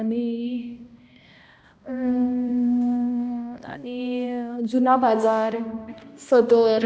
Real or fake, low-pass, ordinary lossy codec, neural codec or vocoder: fake; none; none; codec, 16 kHz, 1 kbps, X-Codec, HuBERT features, trained on balanced general audio